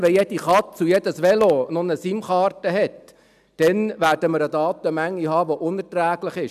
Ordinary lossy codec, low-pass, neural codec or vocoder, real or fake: none; 14.4 kHz; none; real